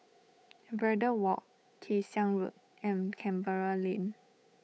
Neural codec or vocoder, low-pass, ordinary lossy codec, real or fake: codec, 16 kHz, 8 kbps, FunCodec, trained on Chinese and English, 25 frames a second; none; none; fake